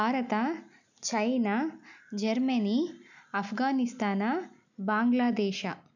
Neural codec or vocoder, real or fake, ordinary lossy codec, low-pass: none; real; none; 7.2 kHz